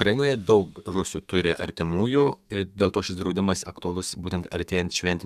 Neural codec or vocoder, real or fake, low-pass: codec, 32 kHz, 1.9 kbps, SNAC; fake; 14.4 kHz